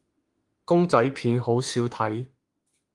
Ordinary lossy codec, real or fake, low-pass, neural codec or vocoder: Opus, 24 kbps; fake; 10.8 kHz; autoencoder, 48 kHz, 32 numbers a frame, DAC-VAE, trained on Japanese speech